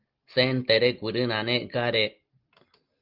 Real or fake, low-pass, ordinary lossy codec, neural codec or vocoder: real; 5.4 kHz; Opus, 32 kbps; none